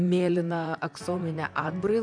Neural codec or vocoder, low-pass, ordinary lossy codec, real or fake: vocoder, 44.1 kHz, 128 mel bands, Pupu-Vocoder; 9.9 kHz; MP3, 96 kbps; fake